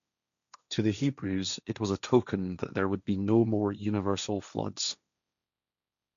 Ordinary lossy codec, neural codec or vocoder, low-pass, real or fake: MP3, 96 kbps; codec, 16 kHz, 1.1 kbps, Voila-Tokenizer; 7.2 kHz; fake